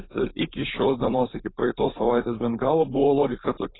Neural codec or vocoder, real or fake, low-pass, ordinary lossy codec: codec, 16 kHz, 4.8 kbps, FACodec; fake; 7.2 kHz; AAC, 16 kbps